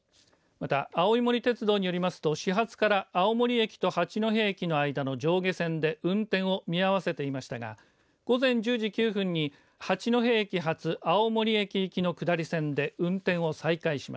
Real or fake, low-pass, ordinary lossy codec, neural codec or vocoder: real; none; none; none